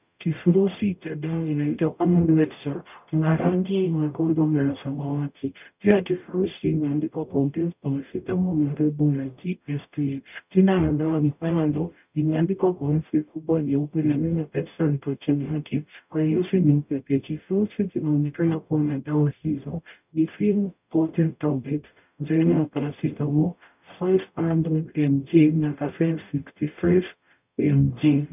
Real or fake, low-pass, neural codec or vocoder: fake; 3.6 kHz; codec, 44.1 kHz, 0.9 kbps, DAC